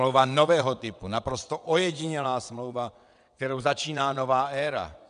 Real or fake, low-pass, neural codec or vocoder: fake; 9.9 kHz; vocoder, 22.05 kHz, 80 mel bands, WaveNeXt